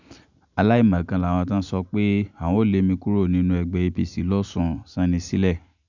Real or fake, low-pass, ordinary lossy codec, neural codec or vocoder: real; 7.2 kHz; none; none